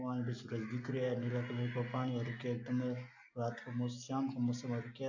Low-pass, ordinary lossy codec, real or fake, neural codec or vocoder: 7.2 kHz; AAC, 48 kbps; real; none